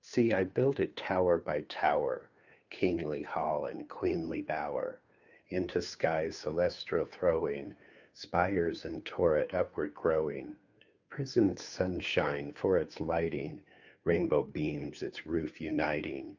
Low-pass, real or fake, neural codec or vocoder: 7.2 kHz; fake; codec, 16 kHz, 2 kbps, FunCodec, trained on Chinese and English, 25 frames a second